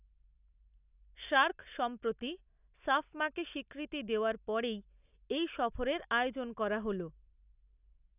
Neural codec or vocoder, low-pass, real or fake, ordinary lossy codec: none; 3.6 kHz; real; none